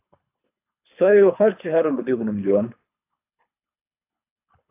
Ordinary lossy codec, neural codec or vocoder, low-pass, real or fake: AAC, 24 kbps; codec, 24 kHz, 3 kbps, HILCodec; 3.6 kHz; fake